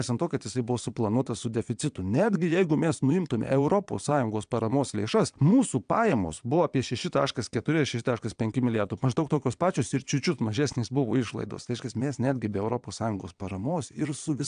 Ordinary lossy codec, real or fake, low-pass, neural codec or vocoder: AAC, 96 kbps; fake; 9.9 kHz; vocoder, 22.05 kHz, 80 mel bands, Vocos